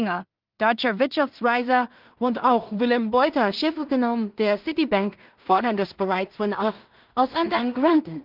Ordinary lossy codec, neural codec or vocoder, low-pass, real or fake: Opus, 32 kbps; codec, 16 kHz in and 24 kHz out, 0.4 kbps, LongCat-Audio-Codec, two codebook decoder; 5.4 kHz; fake